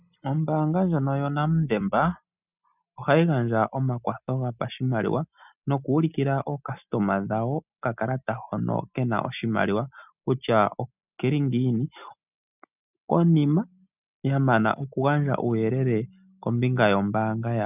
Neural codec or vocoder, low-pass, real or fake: none; 3.6 kHz; real